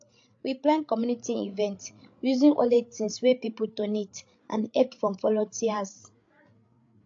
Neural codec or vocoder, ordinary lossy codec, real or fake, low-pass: codec, 16 kHz, 8 kbps, FreqCodec, larger model; AAC, 48 kbps; fake; 7.2 kHz